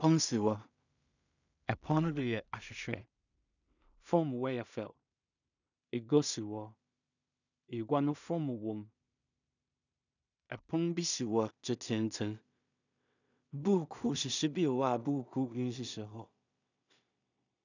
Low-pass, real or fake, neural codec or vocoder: 7.2 kHz; fake; codec, 16 kHz in and 24 kHz out, 0.4 kbps, LongCat-Audio-Codec, two codebook decoder